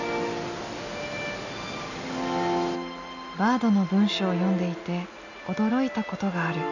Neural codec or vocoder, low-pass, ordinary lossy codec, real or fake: none; 7.2 kHz; none; real